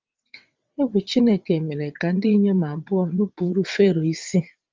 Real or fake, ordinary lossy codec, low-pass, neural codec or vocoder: fake; Opus, 32 kbps; 7.2 kHz; vocoder, 22.05 kHz, 80 mel bands, WaveNeXt